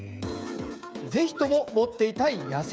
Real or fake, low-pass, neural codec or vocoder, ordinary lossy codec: fake; none; codec, 16 kHz, 8 kbps, FreqCodec, smaller model; none